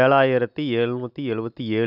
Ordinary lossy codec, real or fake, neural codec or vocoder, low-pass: none; real; none; 5.4 kHz